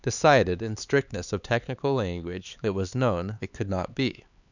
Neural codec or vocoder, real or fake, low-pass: codec, 16 kHz, 4 kbps, X-Codec, HuBERT features, trained on LibriSpeech; fake; 7.2 kHz